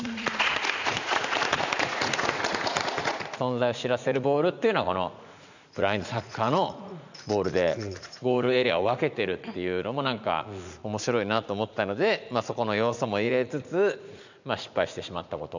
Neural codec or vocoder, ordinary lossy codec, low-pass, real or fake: vocoder, 44.1 kHz, 80 mel bands, Vocos; none; 7.2 kHz; fake